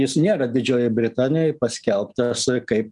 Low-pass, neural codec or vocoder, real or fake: 10.8 kHz; none; real